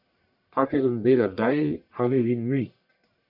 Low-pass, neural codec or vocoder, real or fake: 5.4 kHz; codec, 44.1 kHz, 1.7 kbps, Pupu-Codec; fake